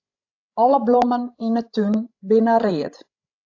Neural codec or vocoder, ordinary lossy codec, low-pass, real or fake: codec, 16 kHz, 16 kbps, FreqCodec, larger model; AAC, 48 kbps; 7.2 kHz; fake